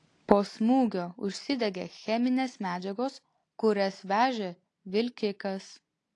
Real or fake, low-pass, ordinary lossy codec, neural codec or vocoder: real; 10.8 kHz; AAC, 48 kbps; none